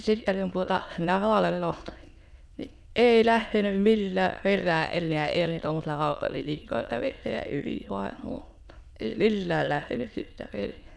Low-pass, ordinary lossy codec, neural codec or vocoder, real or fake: none; none; autoencoder, 22.05 kHz, a latent of 192 numbers a frame, VITS, trained on many speakers; fake